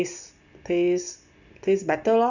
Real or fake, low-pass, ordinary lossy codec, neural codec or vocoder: real; 7.2 kHz; none; none